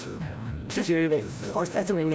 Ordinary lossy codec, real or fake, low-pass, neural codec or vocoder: none; fake; none; codec, 16 kHz, 0.5 kbps, FreqCodec, larger model